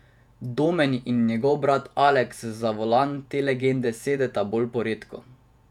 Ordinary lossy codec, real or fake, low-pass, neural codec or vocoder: none; fake; 19.8 kHz; vocoder, 48 kHz, 128 mel bands, Vocos